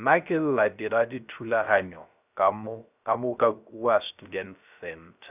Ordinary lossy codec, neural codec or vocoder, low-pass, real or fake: none; codec, 16 kHz, 0.3 kbps, FocalCodec; 3.6 kHz; fake